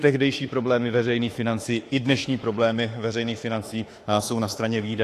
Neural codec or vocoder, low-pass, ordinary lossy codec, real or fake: autoencoder, 48 kHz, 32 numbers a frame, DAC-VAE, trained on Japanese speech; 14.4 kHz; AAC, 48 kbps; fake